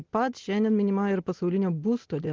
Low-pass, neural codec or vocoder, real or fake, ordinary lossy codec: 7.2 kHz; none; real; Opus, 16 kbps